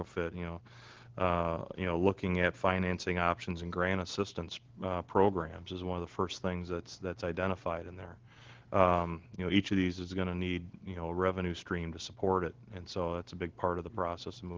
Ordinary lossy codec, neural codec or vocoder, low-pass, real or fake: Opus, 16 kbps; none; 7.2 kHz; real